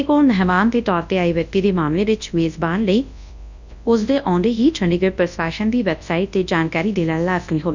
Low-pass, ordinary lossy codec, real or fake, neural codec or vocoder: 7.2 kHz; none; fake; codec, 24 kHz, 0.9 kbps, WavTokenizer, large speech release